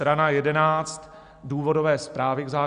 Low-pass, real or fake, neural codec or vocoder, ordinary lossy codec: 9.9 kHz; real; none; Opus, 64 kbps